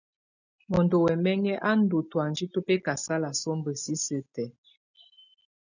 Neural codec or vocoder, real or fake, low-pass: none; real; 7.2 kHz